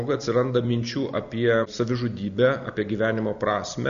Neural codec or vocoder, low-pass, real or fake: none; 7.2 kHz; real